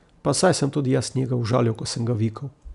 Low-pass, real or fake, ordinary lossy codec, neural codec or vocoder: 10.8 kHz; real; none; none